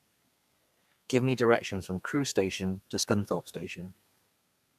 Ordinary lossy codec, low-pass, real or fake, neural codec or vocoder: none; 14.4 kHz; fake; codec, 32 kHz, 1.9 kbps, SNAC